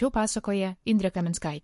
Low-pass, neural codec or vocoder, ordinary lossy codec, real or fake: 14.4 kHz; codec, 44.1 kHz, 7.8 kbps, Pupu-Codec; MP3, 48 kbps; fake